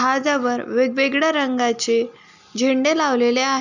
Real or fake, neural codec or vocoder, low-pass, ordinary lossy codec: real; none; 7.2 kHz; none